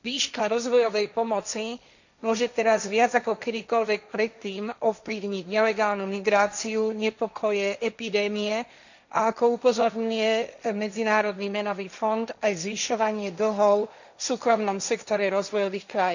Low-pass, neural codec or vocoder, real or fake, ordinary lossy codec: 7.2 kHz; codec, 16 kHz, 1.1 kbps, Voila-Tokenizer; fake; none